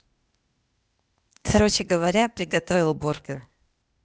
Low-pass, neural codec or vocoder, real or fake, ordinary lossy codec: none; codec, 16 kHz, 0.8 kbps, ZipCodec; fake; none